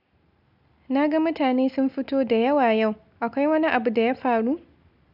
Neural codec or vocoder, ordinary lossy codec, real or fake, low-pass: none; none; real; 5.4 kHz